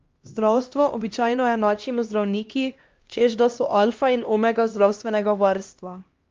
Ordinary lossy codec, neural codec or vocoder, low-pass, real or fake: Opus, 32 kbps; codec, 16 kHz, 1 kbps, X-Codec, HuBERT features, trained on LibriSpeech; 7.2 kHz; fake